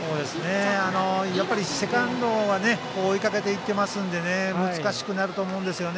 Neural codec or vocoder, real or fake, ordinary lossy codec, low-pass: none; real; none; none